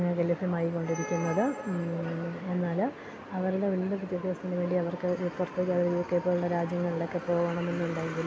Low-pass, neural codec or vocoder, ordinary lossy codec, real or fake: none; none; none; real